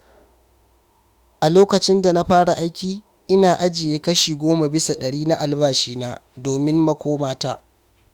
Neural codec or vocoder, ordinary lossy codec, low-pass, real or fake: autoencoder, 48 kHz, 32 numbers a frame, DAC-VAE, trained on Japanese speech; Opus, 64 kbps; 19.8 kHz; fake